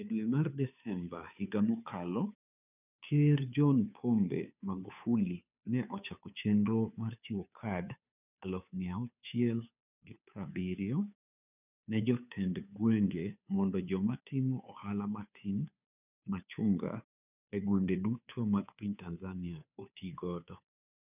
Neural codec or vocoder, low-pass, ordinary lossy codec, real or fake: codec, 16 kHz, 2 kbps, FunCodec, trained on Chinese and English, 25 frames a second; 3.6 kHz; none; fake